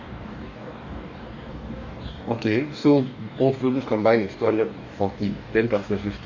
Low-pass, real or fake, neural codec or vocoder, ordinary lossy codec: 7.2 kHz; fake; codec, 44.1 kHz, 2.6 kbps, DAC; none